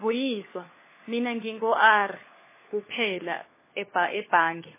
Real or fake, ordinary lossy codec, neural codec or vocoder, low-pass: fake; MP3, 16 kbps; codec, 16 kHz, 4 kbps, FunCodec, trained on LibriTTS, 50 frames a second; 3.6 kHz